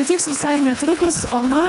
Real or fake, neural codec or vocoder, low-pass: fake; codec, 24 kHz, 1.5 kbps, HILCodec; 10.8 kHz